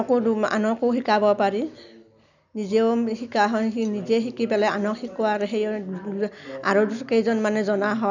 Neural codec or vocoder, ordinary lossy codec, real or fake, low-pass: none; none; real; 7.2 kHz